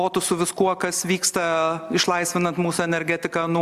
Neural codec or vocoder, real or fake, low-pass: none; real; 14.4 kHz